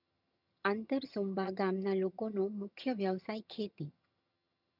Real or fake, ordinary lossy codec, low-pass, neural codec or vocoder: fake; none; 5.4 kHz; vocoder, 22.05 kHz, 80 mel bands, HiFi-GAN